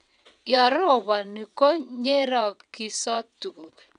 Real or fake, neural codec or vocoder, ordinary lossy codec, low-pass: fake; vocoder, 22.05 kHz, 80 mel bands, WaveNeXt; none; 9.9 kHz